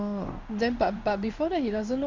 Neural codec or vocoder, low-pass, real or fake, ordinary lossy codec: codec, 16 kHz in and 24 kHz out, 1 kbps, XY-Tokenizer; 7.2 kHz; fake; MP3, 64 kbps